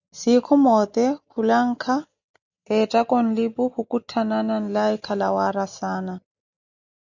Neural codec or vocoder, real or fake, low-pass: none; real; 7.2 kHz